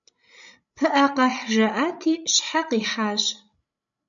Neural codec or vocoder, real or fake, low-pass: codec, 16 kHz, 16 kbps, FreqCodec, larger model; fake; 7.2 kHz